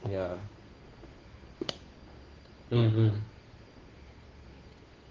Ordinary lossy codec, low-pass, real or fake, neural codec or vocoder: Opus, 16 kbps; 7.2 kHz; fake; codec, 16 kHz, 2 kbps, FunCodec, trained on Chinese and English, 25 frames a second